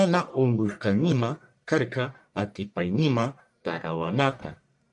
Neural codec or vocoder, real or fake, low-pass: codec, 44.1 kHz, 1.7 kbps, Pupu-Codec; fake; 10.8 kHz